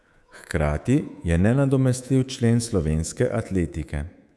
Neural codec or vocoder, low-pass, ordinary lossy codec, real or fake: codec, 24 kHz, 3.1 kbps, DualCodec; none; none; fake